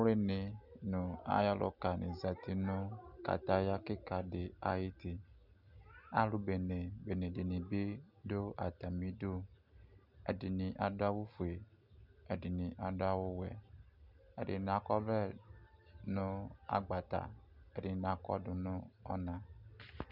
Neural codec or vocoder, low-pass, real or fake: none; 5.4 kHz; real